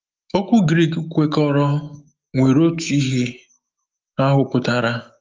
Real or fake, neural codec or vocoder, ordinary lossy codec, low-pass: fake; autoencoder, 48 kHz, 128 numbers a frame, DAC-VAE, trained on Japanese speech; Opus, 24 kbps; 7.2 kHz